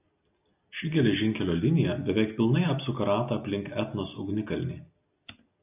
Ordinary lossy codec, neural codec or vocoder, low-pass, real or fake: AAC, 32 kbps; none; 3.6 kHz; real